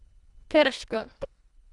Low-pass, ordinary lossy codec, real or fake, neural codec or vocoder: 10.8 kHz; Opus, 64 kbps; fake; codec, 24 kHz, 1.5 kbps, HILCodec